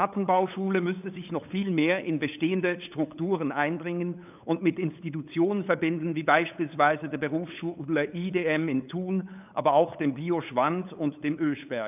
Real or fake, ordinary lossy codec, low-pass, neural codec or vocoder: fake; none; 3.6 kHz; codec, 16 kHz, 8 kbps, FunCodec, trained on LibriTTS, 25 frames a second